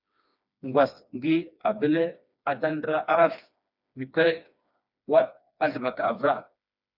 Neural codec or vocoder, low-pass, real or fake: codec, 16 kHz, 2 kbps, FreqCodec, smaller model; 5.4 kHz; fake